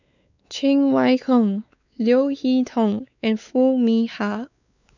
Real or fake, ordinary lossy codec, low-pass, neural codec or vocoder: fake; none; 7.2 kHz; codec, 16 kHz, 4 kbps, X-Codec, WavLM features, trained on Multilingual LibriSpeech